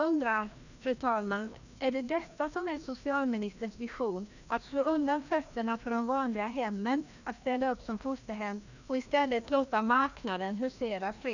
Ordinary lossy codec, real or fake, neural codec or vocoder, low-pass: none; fake; codec, 16 kHz, 1 kbps, FreqCodec, larger model; 7.2 kHz